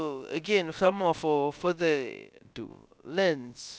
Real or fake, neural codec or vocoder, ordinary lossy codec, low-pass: fake; codec, 16 kHz, about 1 kbps, DyCAST, with the encoder's durations; none; none